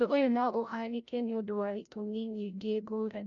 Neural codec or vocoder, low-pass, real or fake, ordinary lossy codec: codec, 16 kHz, 0.5 kbps, FreqCodec, larger model; 7.2 kHz; fake; none